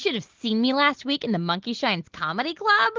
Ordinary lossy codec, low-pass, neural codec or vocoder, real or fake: Opus, 16 kbps; 7.2 kHz; none; real